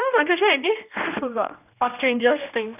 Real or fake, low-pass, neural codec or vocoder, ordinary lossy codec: fake; 3.6 kHz; codec, 16 kHz, 1 kbps, X-Codec, HuBERT features, trained on general audio; none